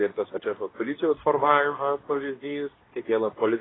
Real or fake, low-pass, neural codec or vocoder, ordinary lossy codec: fake; 7.2 kHz; codec, 24 kHz, 0.9 kbps, WavTokenizer, medium speech release version 2; AAC, 16 kbps